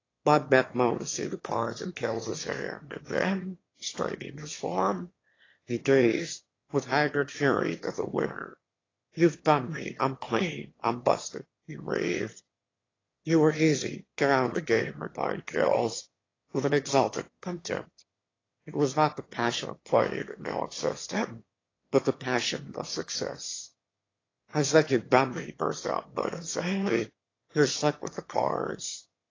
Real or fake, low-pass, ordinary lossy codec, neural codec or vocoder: fake; 7.2 kHz; AAC, 32 kbps; autoencoder, 22.05 kHz, a latent of 192 numbers a frame, VITS, trained on one speaker